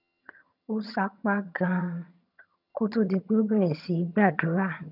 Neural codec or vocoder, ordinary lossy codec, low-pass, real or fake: vocoder, 22.05 kHz, 80 mel bands, HiFi-GAN; none; 5.4 kHz; fake